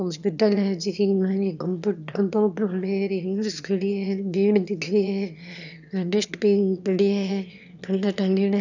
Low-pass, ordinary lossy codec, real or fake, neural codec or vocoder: 7.2 kHz; none; fake; autoencoder, 22.05 kHz, a latent of 192 numbers a frame, VITS, trained on one speaker